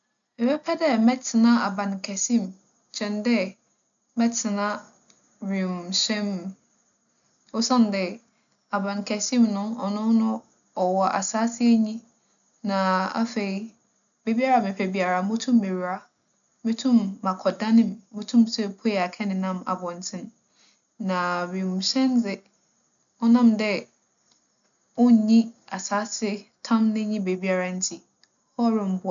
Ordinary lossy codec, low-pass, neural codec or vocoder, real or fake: none; 7.2 kHz; none; real